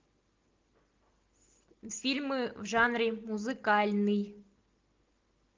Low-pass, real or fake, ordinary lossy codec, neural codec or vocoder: 7.2 kHz; real; Opus, 24 kbps; none